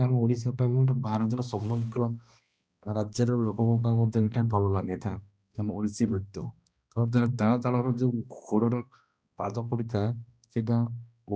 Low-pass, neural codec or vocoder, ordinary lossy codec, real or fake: none; codec, 16 kHz, 1 kbps, X-Codec, HuBERT features, trained on balanced general audio; none; fake